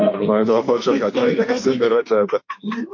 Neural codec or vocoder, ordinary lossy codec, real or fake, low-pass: autoencoder, 48 kHz, 32 numbers a frame, DAC-VAE, trained on Japanese speech; MP3, 48 kbps; fake; 7.2 kHz